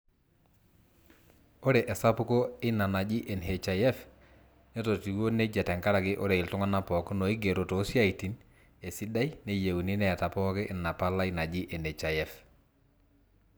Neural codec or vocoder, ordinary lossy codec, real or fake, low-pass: none; none; real; none